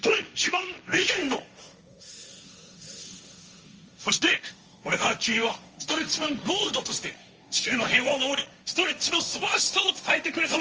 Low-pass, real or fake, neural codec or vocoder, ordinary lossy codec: 7.2 kHz; fake; codec, 16 kHz, 1.1 kbps, Voila-Tokenizer; Opus, 24 kbps